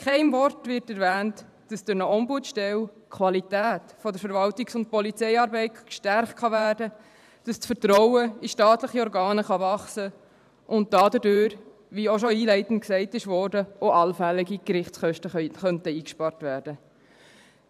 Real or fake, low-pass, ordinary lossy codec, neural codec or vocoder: fake; 14.4 kHz; none; vocoder, 44.1 kHz, 128 mel bands every 512 samples, BigVGAN v2